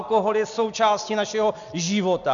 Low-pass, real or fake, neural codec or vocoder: 7.2 kHz; real; none